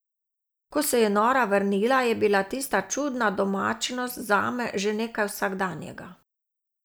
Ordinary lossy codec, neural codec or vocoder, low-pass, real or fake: none; none; none; real